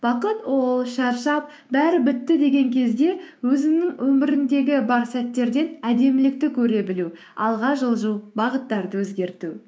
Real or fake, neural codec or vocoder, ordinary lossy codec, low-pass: fake; codec, 16 kHz, 6 kbps, DAC; none; none